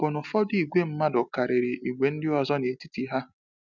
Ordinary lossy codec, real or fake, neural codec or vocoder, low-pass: none; real; none; 7.2 kHz